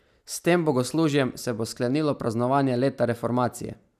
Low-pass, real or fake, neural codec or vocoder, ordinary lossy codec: 14.4 kHz; real; none; none